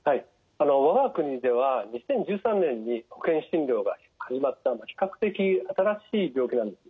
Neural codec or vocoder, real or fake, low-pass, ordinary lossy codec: none; real; none; none